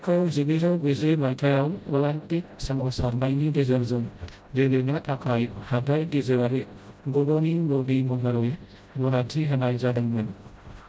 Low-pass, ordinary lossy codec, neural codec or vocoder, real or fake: none; none; codec, 16 kHz, 0.5 kbps, FreqCodec, smaller model; fake